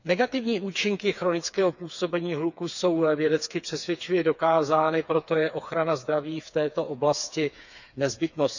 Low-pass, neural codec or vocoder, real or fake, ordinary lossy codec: 7.2 kHz; codec, 16 kHz, 4 kbps, FreqCodec, smaller model; fake; none